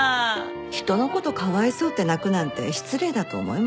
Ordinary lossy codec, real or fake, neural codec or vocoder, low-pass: none; real; none; none